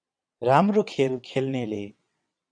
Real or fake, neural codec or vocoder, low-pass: fake; vocoder, 22.05 kHz, 80 mel bands, WaveNeXt; 9.9 kHz